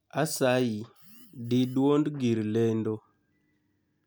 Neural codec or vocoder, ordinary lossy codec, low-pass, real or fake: none; none; none; real